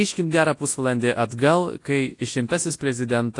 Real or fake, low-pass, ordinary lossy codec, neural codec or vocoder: fake; 10.8 kHz; AAC, 48 kbps; codec, 24 kHz, 0.9 kbps, WavTokenizer, large speech release